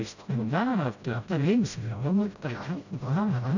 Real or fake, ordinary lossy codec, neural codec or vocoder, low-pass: fake; none; codec, 16 kHz, 0.5 kbps, FreqCodec, smaller model; 7.2 kHz